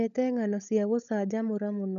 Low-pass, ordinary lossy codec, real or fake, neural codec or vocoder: 7.2 kHz; MP3, 96 kbps; fake; codec, 16 kHz, 16 kbps, FunCodec, trained on LibriTTS, 50 frames a second